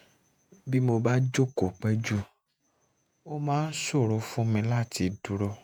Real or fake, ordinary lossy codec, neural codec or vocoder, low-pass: fake; none; vocoder, 48 kHz, 128 mel bands, Vocos; none